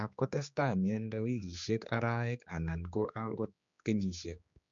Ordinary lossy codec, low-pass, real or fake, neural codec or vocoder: MP3, 64 kbps; 7.2 kHz; fake; codec, 16 kHz, 2 kbps, X-Codec, HuBERT features, trained on general audio